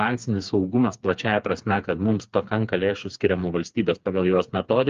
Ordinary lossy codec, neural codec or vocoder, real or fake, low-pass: Opus, 32 kbps; codec, 16 kHz, 4 kbps, FreqCodec, smaller model; fake; 7.2 kHz